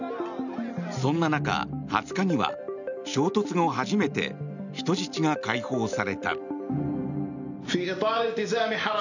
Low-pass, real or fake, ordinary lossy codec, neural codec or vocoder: 7.2 kHz; real; none; none